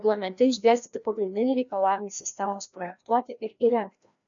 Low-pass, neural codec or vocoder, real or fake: 7.2 kHz; codec, 16 kHz, 1 kbps, FreqCodec, larger model; fake